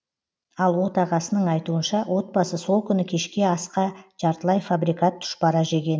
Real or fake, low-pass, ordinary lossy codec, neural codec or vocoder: real; none; none; none